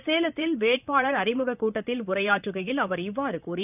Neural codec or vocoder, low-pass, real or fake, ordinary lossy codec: vocoder, 44.1 kHz, 128 mel bands, Pupu-Vocoder; 3.6 kHz; fake; none